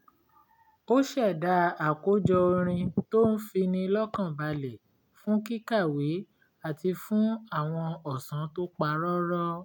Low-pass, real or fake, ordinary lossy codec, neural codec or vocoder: 19.8 kHz; real; none; none